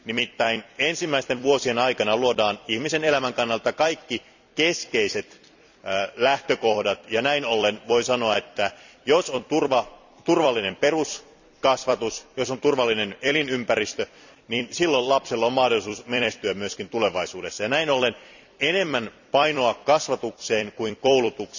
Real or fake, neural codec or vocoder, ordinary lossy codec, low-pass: fake; vocoder, 44.1 kHz, 128 mel bands every 256 samples, BigVGAN v2; none; 7.2 kHz